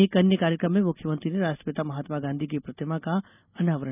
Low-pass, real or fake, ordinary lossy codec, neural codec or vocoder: 3.6 kHz; real; none; none